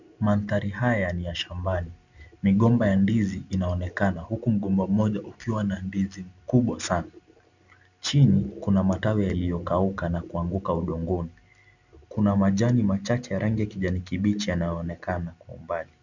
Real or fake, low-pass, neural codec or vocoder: real; 7.2 kHz; none